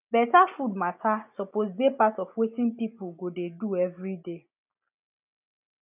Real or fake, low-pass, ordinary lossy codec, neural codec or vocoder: real; 3.6 kHz; none; none